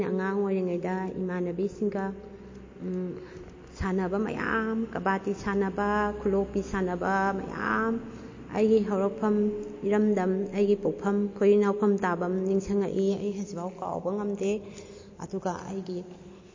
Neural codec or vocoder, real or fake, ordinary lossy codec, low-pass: none; real; MP3, 32 kbps; 7.2 kHz